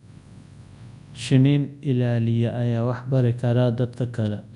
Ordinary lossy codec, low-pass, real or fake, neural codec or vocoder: none; 10.8 kHz; fake; codec, 24 kHz, 0.9 kbps, WavTokenizer, large speech release